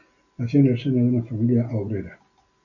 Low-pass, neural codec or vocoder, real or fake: 7.2 kHz; none; real